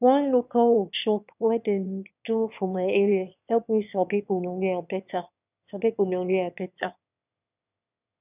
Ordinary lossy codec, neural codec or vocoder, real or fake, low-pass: none; autoencoder, 22.05 kHz, a latent of 192 numbers a frame, VITS, trained on one speaker; fake; 3.6 kHz